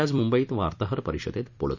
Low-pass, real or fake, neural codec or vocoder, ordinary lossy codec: 7.2 kHz; fake; vocoder, 44.1 kHz, 128 mel bands every 256 samples, BigVGAN v2; none